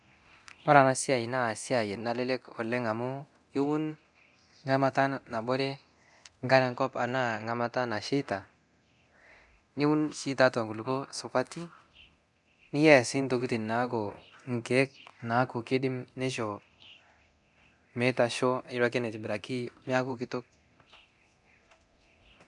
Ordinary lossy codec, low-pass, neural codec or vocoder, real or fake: none; 10.8 kHz; codec, 24 kHz, 0.9 kbps, DualCodec; fake